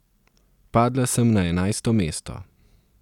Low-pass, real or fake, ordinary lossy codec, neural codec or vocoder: 19.8 kHz; real; none; none